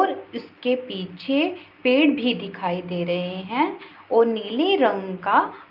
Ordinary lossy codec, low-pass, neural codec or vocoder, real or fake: Opus, 24 kbps; 5.4 kHz; none; real